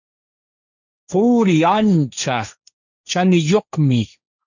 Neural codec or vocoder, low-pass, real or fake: codec, 16 kHz, 1.1 kbps, Voila-Tokenizer; 7.2 kHz; fake